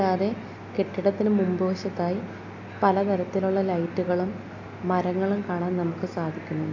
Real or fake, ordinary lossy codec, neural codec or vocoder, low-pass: real; AAC, 48 kbps; none; 7.2 kHz